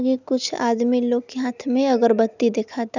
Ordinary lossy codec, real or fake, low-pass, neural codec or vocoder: none; real; 7.2 kHz; none